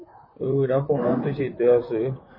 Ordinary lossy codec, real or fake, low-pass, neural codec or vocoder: MP3, 24 kbps; fake; 5.4 kHz; vocoder, 44.1 kHz, 128 mel bands, Pupu-Vocoder